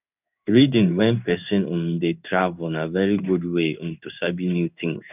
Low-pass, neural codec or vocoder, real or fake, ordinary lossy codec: 3.6 kHz; codec, 16 kHz in and 24 kHz out, 1 kbps, XY-Tokenizer; fake; none